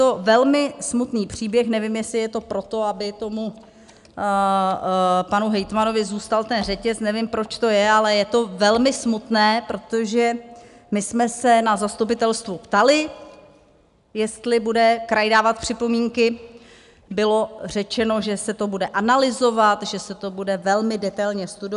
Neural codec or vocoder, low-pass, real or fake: none; 10.8 kHz; real